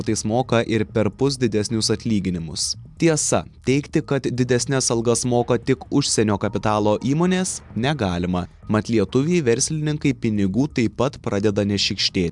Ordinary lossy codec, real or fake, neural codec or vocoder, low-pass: MP3, 96 kbps; real; none; 10.8 kHz